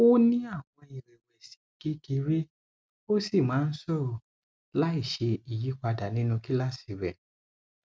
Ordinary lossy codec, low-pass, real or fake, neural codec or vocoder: none; none; real; none